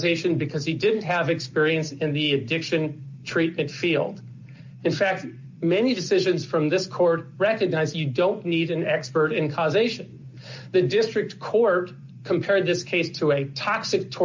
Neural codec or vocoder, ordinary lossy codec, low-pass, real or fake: none; MP3, 48 kbps; 7.2 kHz; real